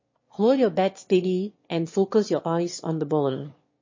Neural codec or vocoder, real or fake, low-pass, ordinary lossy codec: autoencoder, 22.05 kHz, a latent of 192 numbers a frame, VITS, trained on one speaker; fake; 7.2 kHz; MP3, 32 kbps